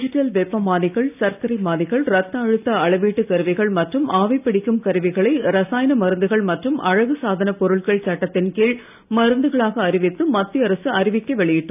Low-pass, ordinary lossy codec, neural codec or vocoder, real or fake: 3.6 kHz; none; none; real